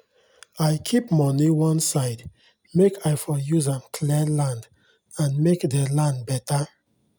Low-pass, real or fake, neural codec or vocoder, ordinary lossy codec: none; real; none; none